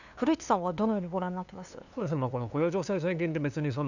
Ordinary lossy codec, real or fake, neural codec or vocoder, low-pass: none; fake; codec, 16 kHz, 2 kbps, FunCodec, trained on LibriTTS, 25 frames a second; 7.2 kHz